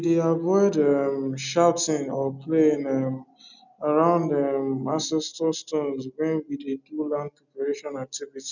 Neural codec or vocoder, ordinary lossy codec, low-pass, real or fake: none; none; 7.2 kHz; real